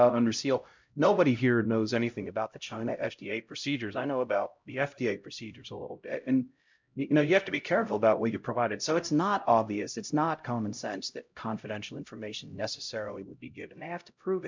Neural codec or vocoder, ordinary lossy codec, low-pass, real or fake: codec, 16 kHz, 0.5 kbps, X-Codec, HuBERT features, trained on LibriSpeech; MP3, 64 kbps; 7.2 kHz; fake